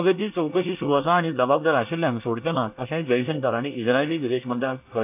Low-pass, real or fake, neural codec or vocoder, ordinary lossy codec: 3.6 kHz; fake; codec, 24 kHz, 1 kbps, SNAC; AAC, 32 kbps